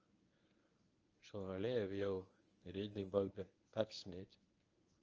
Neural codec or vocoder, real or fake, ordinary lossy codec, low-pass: codec, 24 kHz, 0.9 kbps, WavTokenizer, medium speech release version 1; fake; Opus, 24 kbps; 7.2 kHz